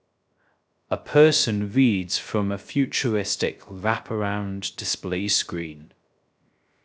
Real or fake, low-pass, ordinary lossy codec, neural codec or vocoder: fake; none; none; codec, 16 kHz, 0.3 kbps, FocalCodec